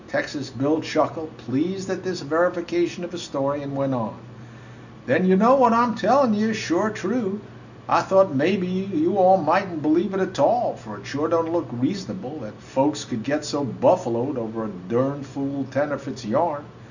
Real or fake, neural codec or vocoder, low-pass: real; none; 7.2 kHz